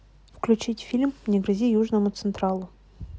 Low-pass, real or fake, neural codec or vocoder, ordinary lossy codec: none; real; none; none